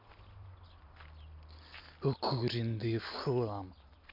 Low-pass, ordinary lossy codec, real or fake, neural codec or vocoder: 5.4 kHz; MP3, 48 kbps; real; none